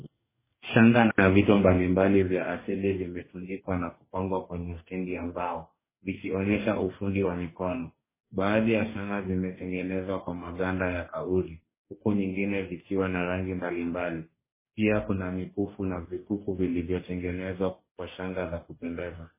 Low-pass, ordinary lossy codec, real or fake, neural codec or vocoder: 3.6 kHz; MP3, 16 kbps; fake; codec, 44.1 kHz, 2.6 kbps, DAC